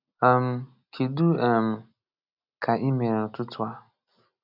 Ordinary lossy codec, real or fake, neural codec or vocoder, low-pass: none; real; none; 5.4 kHz